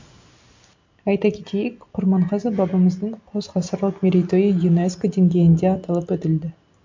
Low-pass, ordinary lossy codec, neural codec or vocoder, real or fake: 7.2 kHz; MP3, 48 kbps; none; real